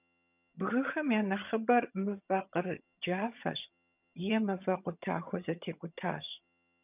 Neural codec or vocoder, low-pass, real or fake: vocoder, 22.05 kHz, 80 mel bands, HiFi-GAN; 3.6 kHz; fake